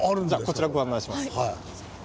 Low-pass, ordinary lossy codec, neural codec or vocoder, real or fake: none; none; none; real